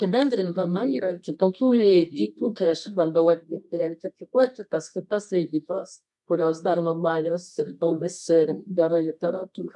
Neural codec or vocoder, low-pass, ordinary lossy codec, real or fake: codec, 24 kHz, 0.9 kbps, WavTokenizer, medium music audio release; 10.8 kHz; MP3, 96 kbps; fake